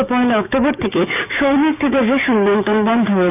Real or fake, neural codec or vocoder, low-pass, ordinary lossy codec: fake; codec, 16 kHz, 6 kbps, DAC; 3.6 kHz; none